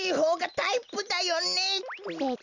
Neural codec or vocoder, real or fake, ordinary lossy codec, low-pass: none; real; none; 7.2 kHz